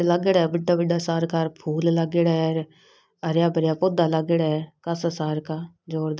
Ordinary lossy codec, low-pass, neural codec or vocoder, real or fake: none; none; none; real